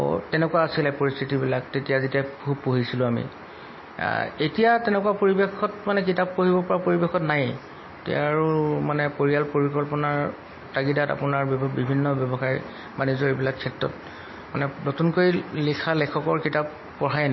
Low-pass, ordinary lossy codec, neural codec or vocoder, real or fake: 7.2 kHz; MP3, 24 kbps; none; real